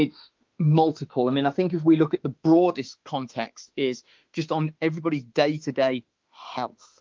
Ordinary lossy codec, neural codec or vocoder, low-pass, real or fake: Opus, 32 kbps; autoencoder, 48 kHz, 32 numbers a frame, DAC-VAE, trained on Japanese speech; 7.2 kHz; fake